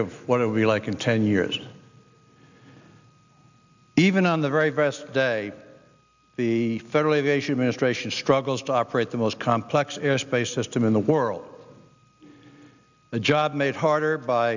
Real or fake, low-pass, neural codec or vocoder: real; 7.2 kHz; none